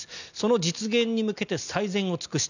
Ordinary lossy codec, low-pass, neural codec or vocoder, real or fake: none; 7.2 kHz; none; real